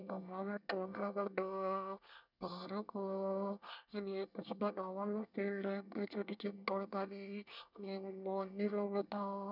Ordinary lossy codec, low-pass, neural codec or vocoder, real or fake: none; 5.4 kHz; codec, 24 kHz, 1 kbps, SNAC; fake